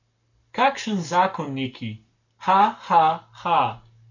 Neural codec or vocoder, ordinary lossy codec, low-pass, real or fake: codec, 44.1 kHz, 7.8 kbps, Pupu-Codec; none; 7.2 kHz; fake